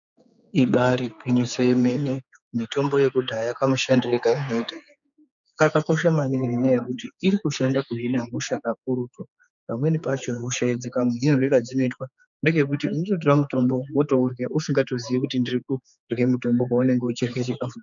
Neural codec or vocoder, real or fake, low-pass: codec, 16 kHz, 4 kbps, X-Codec, HuBERT features, trained on general audio; fake; 7.2 kHz